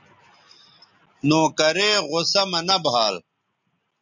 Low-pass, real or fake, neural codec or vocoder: 7.2 kHz; real; none